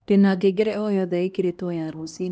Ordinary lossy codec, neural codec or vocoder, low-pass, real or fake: none; codec, 16 kHz, 1 kbps, X-Codec, HuBERT features, trained on LibriSpeech; none; fake